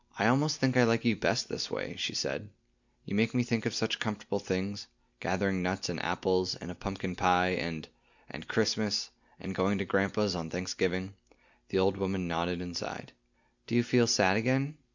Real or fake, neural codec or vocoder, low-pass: real; none; 7.2 kHz